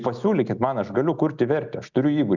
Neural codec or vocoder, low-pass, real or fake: none; 7.2 kHz; real